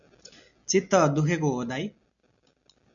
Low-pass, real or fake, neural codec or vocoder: 7.2 kHz; real; none